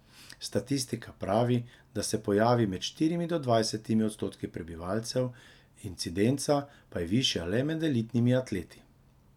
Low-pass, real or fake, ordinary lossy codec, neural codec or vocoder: 19.8 kHz; real; none; none